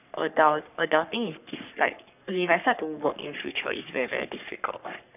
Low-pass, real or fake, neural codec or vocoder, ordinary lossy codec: 3.6 kHz; fake; codec, 44.1 kHz, 3.4 kbps, Pupu-Codec; none